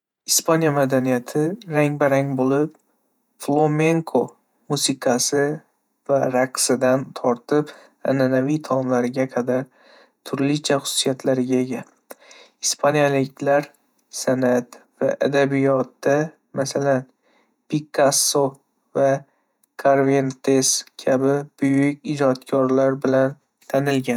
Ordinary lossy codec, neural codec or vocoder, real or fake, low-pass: none; vocoder, 48 kHz, 128 mel bands, Vocos; fake; 19.8 kHz